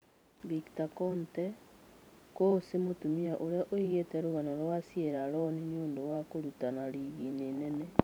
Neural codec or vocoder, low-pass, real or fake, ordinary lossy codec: vocoder, 44.1 kHz, 128 mel bands every 512 samples, BigVGAN v2; none; fake; none